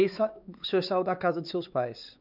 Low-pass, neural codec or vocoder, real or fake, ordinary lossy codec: 5.4 kHz; codec, 16 kHz, 4 kbps, X-Codec, WavLM features, trained on Multilingual LibriSpeech; fake; none